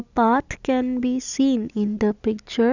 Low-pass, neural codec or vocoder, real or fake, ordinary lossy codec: 7.2 kHz; none; real; none